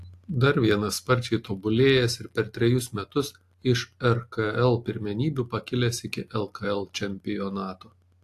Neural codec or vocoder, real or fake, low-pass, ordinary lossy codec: none; real; 14.4 kHz; AAC, 64 kbps